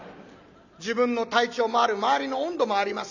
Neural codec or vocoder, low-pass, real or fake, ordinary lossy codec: none; 7.2 kHz; real; none